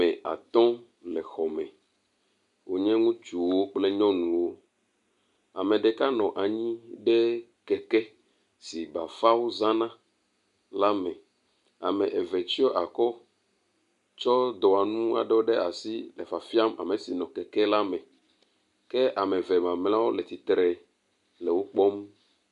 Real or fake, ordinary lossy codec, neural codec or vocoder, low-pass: real; MP3, 64 kbps; none; 10.8 kHz